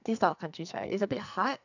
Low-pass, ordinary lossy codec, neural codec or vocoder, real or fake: 7.2 kHz; none; codec, 44.1 kHz, 2.6 kbps, SNAC; fake